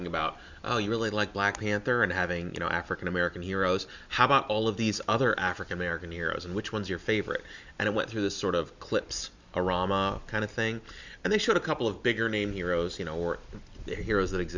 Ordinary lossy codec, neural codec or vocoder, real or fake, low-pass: Opus, 64 kbps; none; real; 7.2 kHz